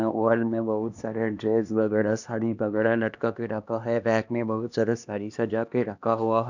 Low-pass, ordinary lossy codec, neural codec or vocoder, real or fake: 7.2 kHz; none; codec, 16 kHz, 2 kbps, X-Codec, HuBERT features, trained on LibriSpeech; fake